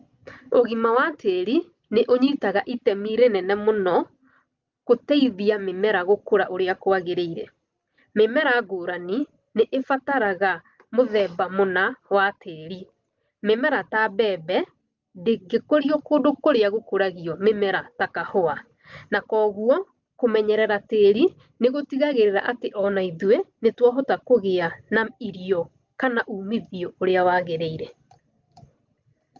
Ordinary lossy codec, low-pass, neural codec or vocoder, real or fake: Opus, 24 kbps; 7.2 kHz; none; real